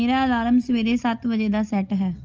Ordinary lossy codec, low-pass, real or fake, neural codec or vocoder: Opus, 24 kbps; 7.2 kHz; real; none